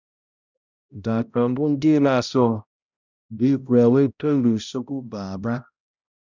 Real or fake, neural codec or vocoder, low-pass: fake; codec, 16 kHz, 0.5 kbps, X-Codec, HuBERT features, trained on balanced general audio; 7.2 kHz